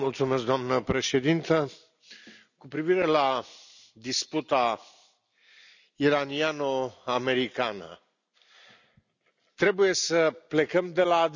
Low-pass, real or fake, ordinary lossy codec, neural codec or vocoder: 7.2 kHz; real; none; none